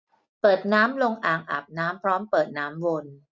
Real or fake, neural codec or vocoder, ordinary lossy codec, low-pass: real; none; none; none